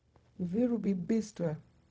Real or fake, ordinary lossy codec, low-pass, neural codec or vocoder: fake; none; none; codec, 16 kHz, 0.4 kbps, LongCat-Audio-Codec